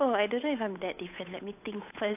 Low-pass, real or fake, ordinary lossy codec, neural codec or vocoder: 3.6 kHz; fake; AAC, 32 kbps; codec, 16 kHz, 8 kbps, FunCodec, trained on Chinese and English, 25 frames a second